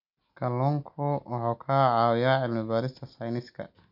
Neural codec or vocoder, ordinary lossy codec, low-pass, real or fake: none; none; 5.4 kHz; real